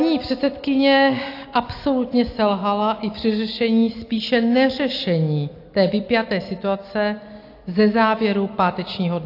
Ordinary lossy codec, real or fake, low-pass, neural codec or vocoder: AAC, 32 kbps; real; 5.4 kHz; none